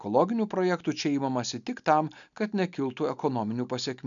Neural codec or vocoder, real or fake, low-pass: none; real; 7.2 kHz